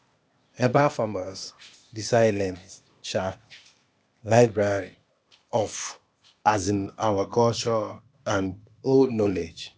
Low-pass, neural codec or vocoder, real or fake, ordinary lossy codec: none; codec, 16 kHz, 0.8 kbps, ZipCodec; fake; none